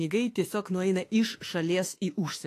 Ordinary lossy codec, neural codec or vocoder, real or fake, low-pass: AAC, 48 kbps; autoencoder, 48 kHz, 32 numbers a frame, DAC-VAE, trained on Japanese speech; fake; 14.4 kHz